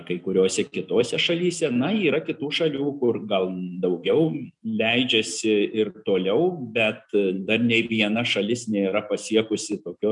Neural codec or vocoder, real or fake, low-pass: none; real; 10.8 kHz